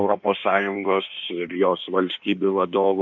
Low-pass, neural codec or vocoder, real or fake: 7.2 kHz; codec, 16 kHz in and 24 kHz out, 1.1 kbps, FireRedTTS-2 codec; fake